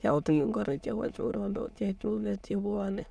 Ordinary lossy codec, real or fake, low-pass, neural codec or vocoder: none; fake; none; autoencoder, 22.05 kHz, a latent of 192 numbers a frame, VITS, trained on many speakers